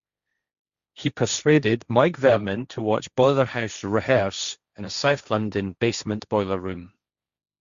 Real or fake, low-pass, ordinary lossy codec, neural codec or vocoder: fake; 7.2 kHz; Opus, 64 kbps; codec, 16 kHz, 1.1 kbps, Voila-Tokenizer